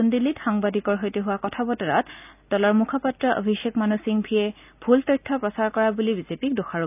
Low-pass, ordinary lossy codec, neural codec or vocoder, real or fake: 3.6 kHz; none; none; real